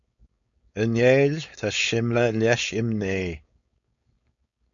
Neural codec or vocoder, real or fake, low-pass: codec, 16 kHz, 4.8 kbps, FACodec; fake; 7.2 kHz